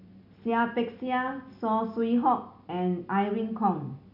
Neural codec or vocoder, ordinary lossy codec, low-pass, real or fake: none; none; 5.4 kHz; real